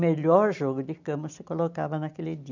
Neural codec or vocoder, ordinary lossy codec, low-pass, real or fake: none; none; 7.2 kHz; real